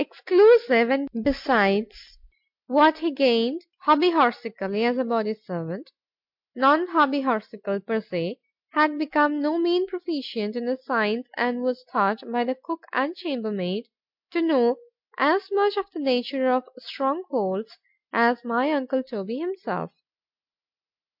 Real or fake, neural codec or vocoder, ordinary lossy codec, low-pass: real; none; MP3, 48 kbps; 5.4 kHz